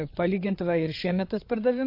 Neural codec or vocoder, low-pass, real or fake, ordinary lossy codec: codec, 16 kHz, 8 kbps, FreqCodec, larger model; 5.4 kHz; fake; MP3, 32 kbps